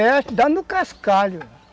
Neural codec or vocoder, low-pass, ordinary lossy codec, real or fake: none; none; none; real